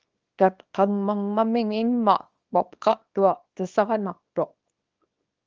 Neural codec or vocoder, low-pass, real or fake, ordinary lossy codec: codec, 24 kHz, 0.9 kbps, WavTokenizer, small release; 7.2 kHz; fake; Opus, 32 kbps